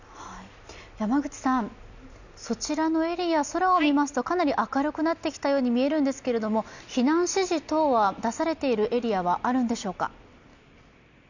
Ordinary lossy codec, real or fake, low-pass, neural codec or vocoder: none; real; 7.2 kHz; none